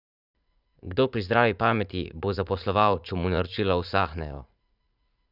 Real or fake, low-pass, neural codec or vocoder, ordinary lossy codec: fake; 5.4 kHz; vocoder, 44.1 kHz, 80 mel bands, Vocos; none